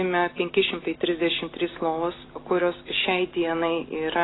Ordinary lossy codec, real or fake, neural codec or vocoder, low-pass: AAC, 16 kbps; real; none; 7.2 kHz